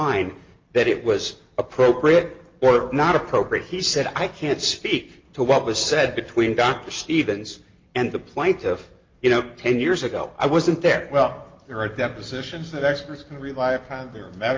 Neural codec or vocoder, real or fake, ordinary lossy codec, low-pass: none; real; Opus, 32 kbps; 7.2 kHz